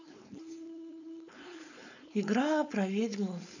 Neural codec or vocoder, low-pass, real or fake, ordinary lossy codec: codec, 16 kHz, 4.8 kbps, FACodec; 7.2 kHz; fake; none